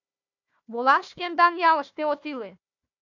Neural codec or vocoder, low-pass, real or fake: codec, 16 kHz, 1 kbps, FunCodec, trained on Chinese and English, 50 frames a second; 7.2 kHz; fake